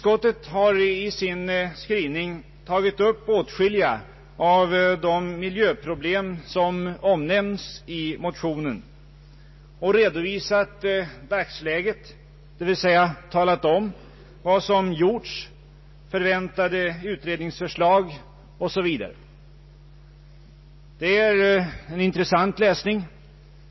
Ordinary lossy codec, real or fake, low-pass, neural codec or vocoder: MP3, 24 kbps; real; 7.2 kHz; none